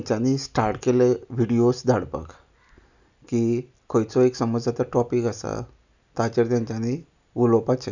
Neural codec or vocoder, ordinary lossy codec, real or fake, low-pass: none; none; real; 7.2 kHz